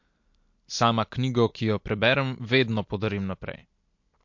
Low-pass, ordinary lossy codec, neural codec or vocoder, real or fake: 7.2 kHz; MP3, 48 kbps; none; real